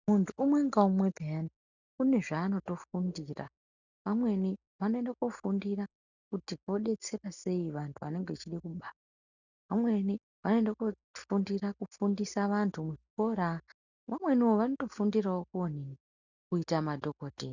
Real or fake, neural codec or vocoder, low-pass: real; none; 7.2 kHz